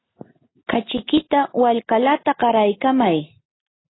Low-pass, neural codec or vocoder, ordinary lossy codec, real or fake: 7.2 kHz; none; AAC, 16 kbps; real